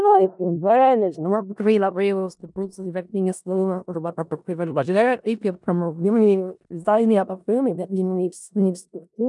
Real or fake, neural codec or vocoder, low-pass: fake; codec, 16 kHz in and 24 kHz out, 0.4 kbps, LongCat-Audio-Codec, four codebook decoder; 10.8 kHz